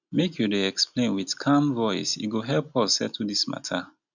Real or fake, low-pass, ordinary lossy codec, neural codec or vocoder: real; 7.2 kHz; none; none